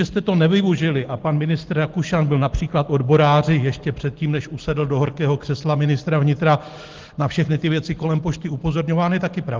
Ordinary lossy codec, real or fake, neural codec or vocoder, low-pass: Opus, 16 kbps; real; none; 7.2 kHz